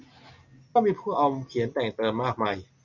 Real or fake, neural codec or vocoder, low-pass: real; none; 7.2 kHz